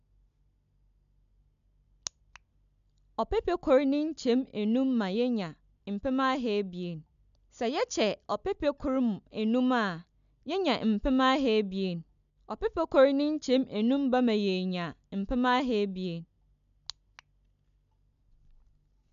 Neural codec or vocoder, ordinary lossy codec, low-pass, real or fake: none; none; 7.2 kHz; real